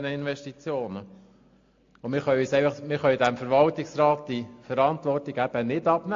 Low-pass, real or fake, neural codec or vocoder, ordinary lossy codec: 7.2 kHz; real; none; AAC, 48 kbps